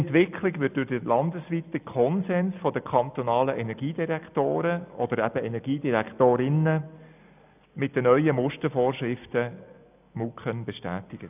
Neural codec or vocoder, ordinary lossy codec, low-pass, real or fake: none; none; 3.6 kHz; real